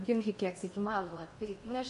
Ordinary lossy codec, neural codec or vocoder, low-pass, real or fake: MP3, 48 kbps; codec, 16 kHz in and 24 kHz out, 0.8 kbps, FocalCodec, streaming, 65536 codes; 10.8 kHz; fake